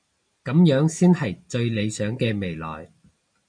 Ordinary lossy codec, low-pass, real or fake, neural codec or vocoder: MP3, 96 kbps; 9.9 kHz; real; none